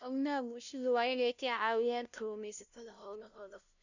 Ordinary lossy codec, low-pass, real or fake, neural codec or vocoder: none; 7.2 kHz; fake; codec, 16 kHz, 0.5 kbps, FunCodec, trained on LibriTTS, 25 frames a second